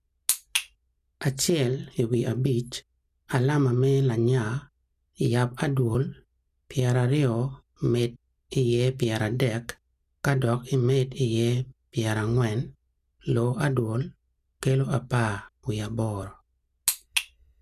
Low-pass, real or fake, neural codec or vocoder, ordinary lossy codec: 14.4 kHz; real; none; none